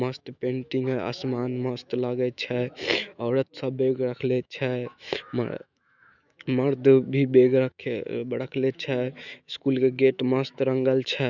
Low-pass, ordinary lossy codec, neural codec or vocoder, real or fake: 7.2 kHz; none; autoencoder, 48 kHz, 128 numbers a frame, DAC-VAE, trained on Japanese speech; fake